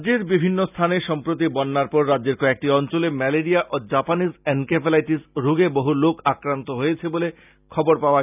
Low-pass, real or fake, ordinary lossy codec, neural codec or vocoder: 3.6 kHz; real; none; none